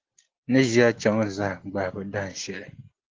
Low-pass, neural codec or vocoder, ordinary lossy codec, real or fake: 7.2 kHz; none; Opus, 16 kbps; real